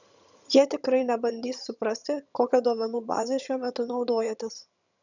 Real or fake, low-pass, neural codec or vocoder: fake; 7.2 kHz; vocoder, 22.05 kHz, 80 mel bands, HiFi-GAN